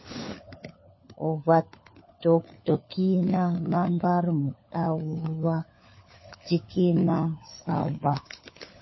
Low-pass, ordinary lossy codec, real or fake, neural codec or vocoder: 7.2 kHz; MP3, 24 kbps; fake; codec, 16 kHz, 4 kbps, FunCodec, trained on LibriTTS, 50 frames a second